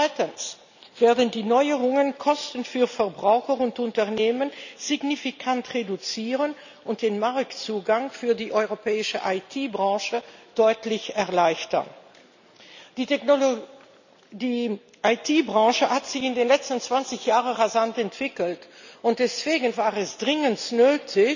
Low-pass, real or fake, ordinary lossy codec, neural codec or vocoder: 7.2 kHz; real; none; none